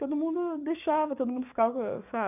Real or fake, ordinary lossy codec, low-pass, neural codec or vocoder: fake; none; 3.6 kHz; codec, 44.1 kHz, 7.8 kbps, DAC